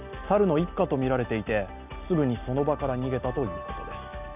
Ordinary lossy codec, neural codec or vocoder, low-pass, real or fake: none; none; 3.6 kHz; real